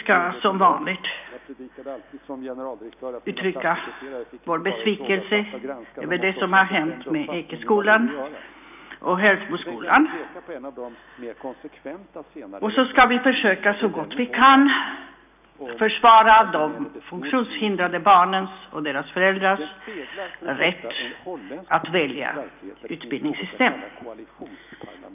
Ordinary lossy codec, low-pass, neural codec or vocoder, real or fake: none; 3.6 kHz; none; real